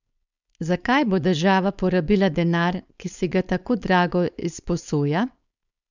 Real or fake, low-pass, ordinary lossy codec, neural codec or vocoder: fake; 7.2 kHz; none; codec, 16 kHz, 4.8 kbps, FACodec